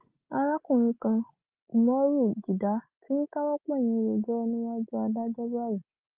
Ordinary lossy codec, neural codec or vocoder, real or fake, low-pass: Opus, 32 kbps; none; real; 3.6 kHz